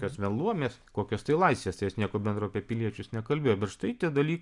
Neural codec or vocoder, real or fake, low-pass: none; real; 10.8 kHz